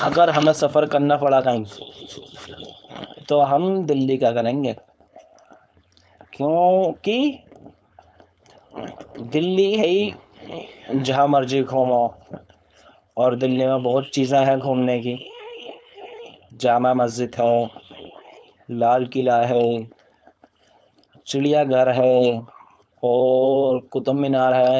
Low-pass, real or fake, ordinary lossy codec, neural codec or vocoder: none; fake; none; codec, 16 kHz, 4.8 kbps, FACodec